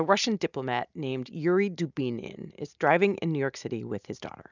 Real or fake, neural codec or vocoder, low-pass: real; none; 7.2 kHz